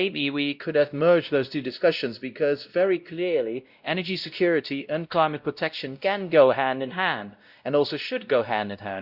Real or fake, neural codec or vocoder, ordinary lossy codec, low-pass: fake; codec, 16 kHz, 0.5 kbps, X-Codec, WavLM features, trained on Multilingual LibriSpeech; Opus, 64 kbps; 5.4 kHz